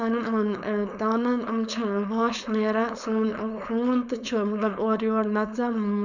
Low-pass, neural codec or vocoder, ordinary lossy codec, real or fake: 7.2 kHz; codec, 16 kHz, 4.8 kbps, FACodec; none; fake